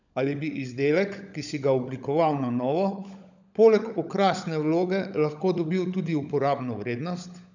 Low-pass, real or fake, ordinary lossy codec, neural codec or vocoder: 7.2 kHz; fake; none; codec, 16 kHz, 16 kbps, FunCodec, trained on LibriTTS, 50 frames a second